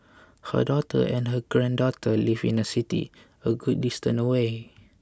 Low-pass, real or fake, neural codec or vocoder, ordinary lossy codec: none; real; none; none